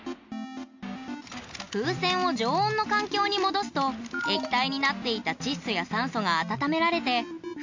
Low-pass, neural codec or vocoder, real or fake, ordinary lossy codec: 7.2 kHz; none; real; MP3, 48 kbps